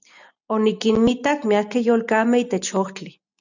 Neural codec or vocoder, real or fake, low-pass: none; real; 7.2 kHz